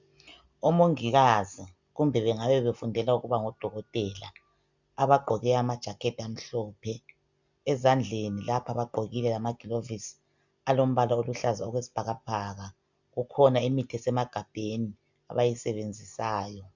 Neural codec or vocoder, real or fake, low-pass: none; real; 7.2 kHz